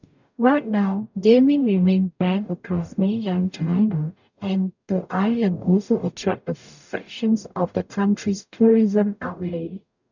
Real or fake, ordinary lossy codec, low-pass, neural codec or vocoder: fake; none; 7.2 kHz; codec, 44.1 kHz, 0.9 kbps, DAC